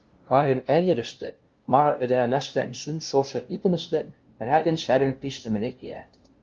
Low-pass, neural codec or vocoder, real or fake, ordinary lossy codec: 7.2 kHz; codec, 16 kHz, 0.5 kbps, FunCodec, trained on LibriTTS, 25 frames a second; fake; Opus, 32 kbps